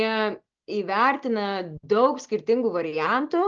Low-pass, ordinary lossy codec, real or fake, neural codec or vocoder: 7.2 kHz; Opus, 24 kbps; real; none